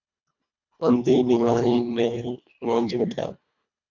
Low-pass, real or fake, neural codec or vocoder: 7.2 kHz; fake; codec, 24 kHz, 1.5 kbps, HILCodec